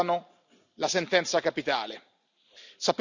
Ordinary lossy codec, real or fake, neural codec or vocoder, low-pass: none; real; none; 7.2 kHz